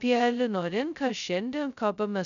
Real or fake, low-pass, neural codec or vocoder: fake; 7.2 kHz; codec, 16 kHz, 0.2 kbps, FocalCodec